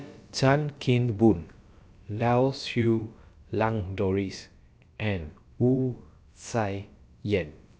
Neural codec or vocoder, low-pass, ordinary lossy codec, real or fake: codec, 16 kHz, about 1 kbps, DyCAST, with the encoder's durations; none; none; fake